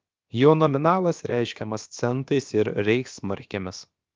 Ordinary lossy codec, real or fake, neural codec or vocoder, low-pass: Opus, 24 kbps; fake; codec, 16 kHz, about 1 kbps, DyCAST, with the encoder's durations; 7.2 kHz